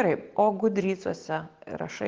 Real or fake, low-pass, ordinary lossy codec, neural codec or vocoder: real; 7.2 kHz; Opus, 16 kbps; none